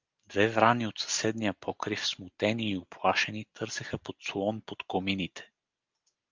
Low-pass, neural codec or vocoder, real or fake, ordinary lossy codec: 7.2 kHz; none; real; Opus, 24 kbps